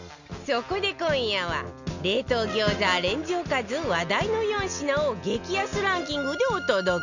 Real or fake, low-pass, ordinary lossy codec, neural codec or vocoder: real; 7.2 kHz; none; none